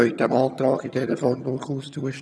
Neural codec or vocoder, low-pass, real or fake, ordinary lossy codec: vocoder, 22.05 kHz, 80 mel bands, HiFi-GAN; none; fake; none